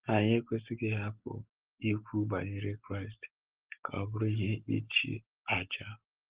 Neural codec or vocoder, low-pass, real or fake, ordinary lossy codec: none; 3.6 kHz; real; Opus, 16 kbps